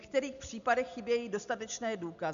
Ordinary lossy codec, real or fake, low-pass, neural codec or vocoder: AAC, 96 kbps; real; 7.2 kHz; none